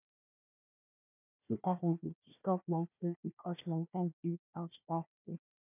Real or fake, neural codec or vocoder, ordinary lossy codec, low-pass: fake; codec, 16 kHz, 1 kbps, FunCodec, trained on Chinese and English, 50 frames a second; none; 3.6 kHz